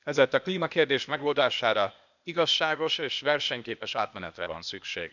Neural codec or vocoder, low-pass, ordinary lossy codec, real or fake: codec, 16 kHz, 0.8 kbps, ZipCodec; 7.2 kHz; none; fake